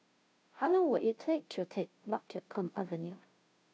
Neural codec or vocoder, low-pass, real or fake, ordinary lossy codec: codec, 16 kHz, 0.5 kbps, FunCodec, trained on Chinese and English, 25 frames a second; none; fake; none